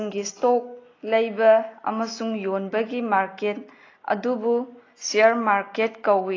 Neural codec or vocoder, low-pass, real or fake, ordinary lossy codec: none; 7.2 kHz; real; AAC, 32 kbps